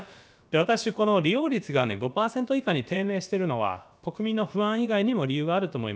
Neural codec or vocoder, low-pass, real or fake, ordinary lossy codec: codec, 16 kHz, about 1 kbps, DyCAST, with the encoder's durations; none; fake; none